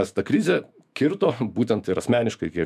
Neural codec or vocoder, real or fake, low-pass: autoencoder, 48 kHz, 128 numbers a frame, DAC-VAE, trained on Japanese speech; fake; 14.4 kHz